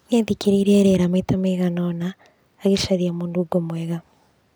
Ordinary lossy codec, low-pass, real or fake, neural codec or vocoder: none; none; real; none